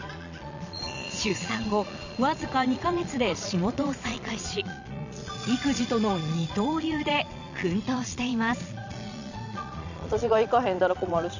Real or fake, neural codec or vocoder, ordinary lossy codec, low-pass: fake; vocoder, 22.05 kHz, 80 mel bands, Vocos; none; 7.2 kHz